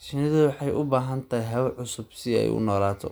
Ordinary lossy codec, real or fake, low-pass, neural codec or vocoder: none; real; none; none